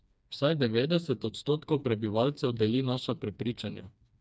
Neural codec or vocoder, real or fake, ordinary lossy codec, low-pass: codec, 16 kHz, 2 kbps, FreqCodec, smaller model; fake; none; none